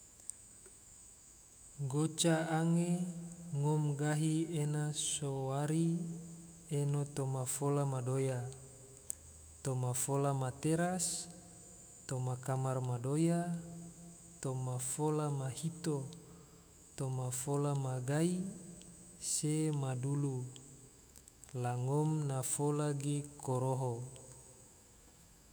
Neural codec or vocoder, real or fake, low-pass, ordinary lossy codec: autoencoder, 48 kHz, 128 numbers a frame, DAC-VAE, trained on Japanese speech; fake; none; none